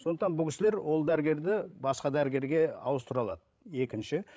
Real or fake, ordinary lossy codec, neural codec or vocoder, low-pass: fake; none; codec, 16 kHz, 16 kbps, FreqCodec, larger model; none